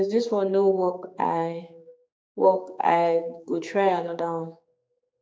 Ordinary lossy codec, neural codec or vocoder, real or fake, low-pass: none; codec, 16 kHz, 4 kbps, X-Codec, HuBERT features, trained on general audio; fake; none